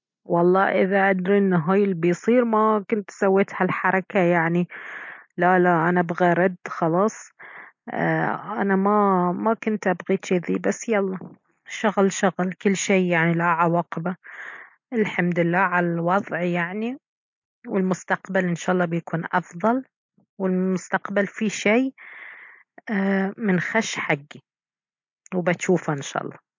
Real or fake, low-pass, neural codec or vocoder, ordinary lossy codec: real; 7.2 kHz; none; MP3, 48 kbps